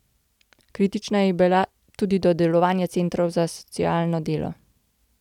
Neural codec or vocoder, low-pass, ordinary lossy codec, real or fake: none; 19.8 kHz; none; real